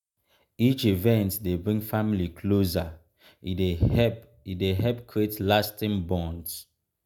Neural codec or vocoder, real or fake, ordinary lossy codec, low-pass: none; real; none; none